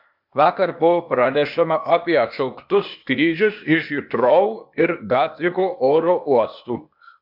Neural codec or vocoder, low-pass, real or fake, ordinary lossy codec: codec, 16 kHz, 0.8 kbps, ZipCodec; 5.4 kHz; fake; MP3, 48 kbps